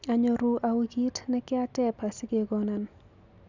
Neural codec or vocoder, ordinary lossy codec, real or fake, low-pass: none; none; real; 7.2 kHz